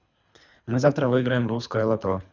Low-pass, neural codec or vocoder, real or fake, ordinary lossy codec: 7.2 kHz; codec, 24 kHz, 3 kbps, HILCodec; fake; none